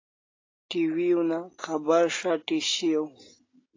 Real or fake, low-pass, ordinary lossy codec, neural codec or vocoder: real; 7.2 kHz; AAC, 32 kbps; none